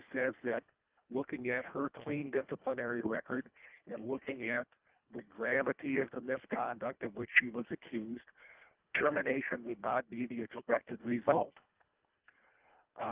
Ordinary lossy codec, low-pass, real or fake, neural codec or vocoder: Opus, 24 kbps; 3.6 kHz; fake; codec, 24 kHz, 1.5 kbps, HILCodec